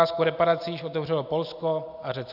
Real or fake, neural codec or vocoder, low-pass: real; none; 5.4 kHz